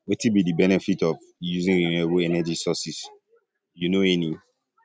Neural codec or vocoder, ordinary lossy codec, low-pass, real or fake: none; none; none; real